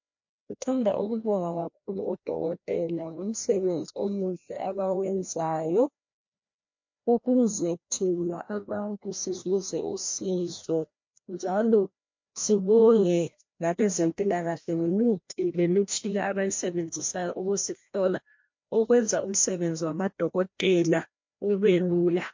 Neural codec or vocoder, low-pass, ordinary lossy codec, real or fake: codec, 16 kHz, 1 kbps, FreqCodec, larger model; 7.2 kHz; MP3, 48 kbps; fake